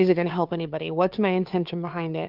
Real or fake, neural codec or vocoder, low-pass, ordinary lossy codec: fake; codec, 16 kHz, 2 kbps, FunCodec, trained on Chinese and English, 25 frames a second; 5.4 kHz; Opus, 32 kbps